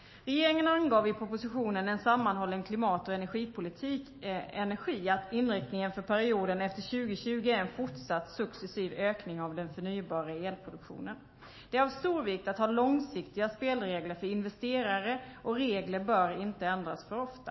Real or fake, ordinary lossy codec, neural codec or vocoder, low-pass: fake; MP3, 24 kbps; autoencoder, 48 kHz, 128 numbers a frame, DAC-VAE, trained on Japanese speech; 7.2 kHz